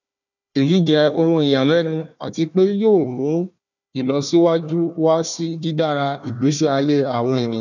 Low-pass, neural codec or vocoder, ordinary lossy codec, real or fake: 7.2 kHz; codec, 16 kHz, 1 kbps, FunCodec, trained on Chinese and English, 50 frames a second; none; fake